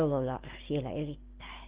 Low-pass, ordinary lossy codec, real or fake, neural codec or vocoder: 3.6 kHz; Opus, 16 kbps; real; none